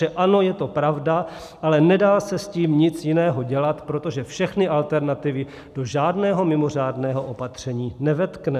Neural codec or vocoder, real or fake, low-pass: none; real; 14.4 kHz